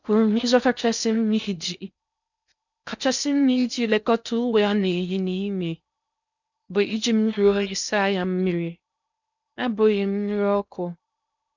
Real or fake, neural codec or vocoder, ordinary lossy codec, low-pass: fake; codec, 16 kHz in and 24 kHz out, 0.6 kbps, FocalCodec, streaming, 4096 codes; none; 7.2 kHz